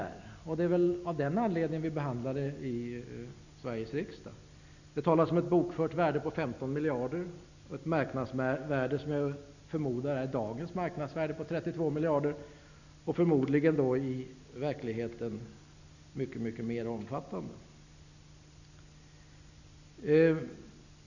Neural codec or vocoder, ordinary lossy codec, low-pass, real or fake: none; none; 7.2 kHz; real